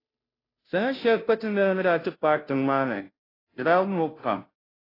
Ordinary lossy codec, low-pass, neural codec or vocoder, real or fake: AAC, 24 kbps; 5.4 kHz; codec, 16 kHz, 0.5 kbps, FunCodec, trained on Chinese and English, 25 frames a second; fake